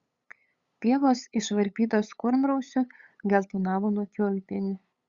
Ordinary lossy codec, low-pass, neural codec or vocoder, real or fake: Opus, 64 kbps; 7.2 kHz; codec, 16 kHz, 8 kbps, FunCodec, trained on LibriTTS, 25 frames a second; fake